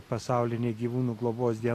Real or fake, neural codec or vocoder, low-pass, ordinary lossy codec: real; none; 14.4 kHz; AAC, 48 kbps